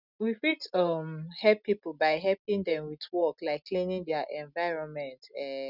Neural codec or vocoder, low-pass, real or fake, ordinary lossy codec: none; 5.4 kHz; real; none